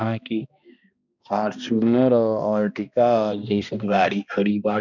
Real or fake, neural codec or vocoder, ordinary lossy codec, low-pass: fake; codec, 16 kHz, 1 kbps, X-Codec, HuBERT features, trained on balanced general audio; none; 7.2 kHz